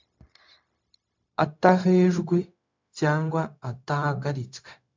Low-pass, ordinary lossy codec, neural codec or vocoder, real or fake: 7.2 kHz; MP3, 64 kbps; codec, 16 kHz, 0.4 kbps, LongCat-Audio-Codec; fake